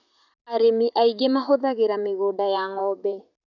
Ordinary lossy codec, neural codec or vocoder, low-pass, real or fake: none; vocoder, 44.1 kHz, 128 mel bands, Pupu-Vocoder; 7.2 kHz; fake